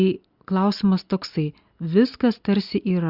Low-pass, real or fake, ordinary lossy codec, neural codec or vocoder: 5.4 kHz; real; Opus, 64 kbps; none